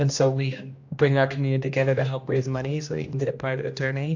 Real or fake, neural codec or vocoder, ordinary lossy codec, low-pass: fake; codec, 16 kHz, 1 kbps, X-Codec, HuBERT features, trained on general audio; AAC, 48 kbps; 7.2 kHz